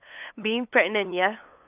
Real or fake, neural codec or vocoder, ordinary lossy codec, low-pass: fake; codec, 16 kHz, 8 kbps, FunCodec, trained on LibriTTS, 25 frames a second; none; 3.6 kHz